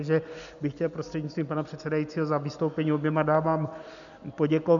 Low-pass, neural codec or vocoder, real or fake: 7.2 kHz; none; real